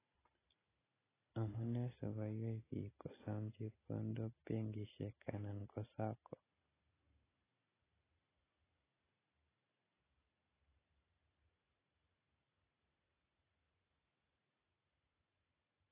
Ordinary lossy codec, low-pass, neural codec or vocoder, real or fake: AAC, 16 kbps; 3.6 kHz; none; real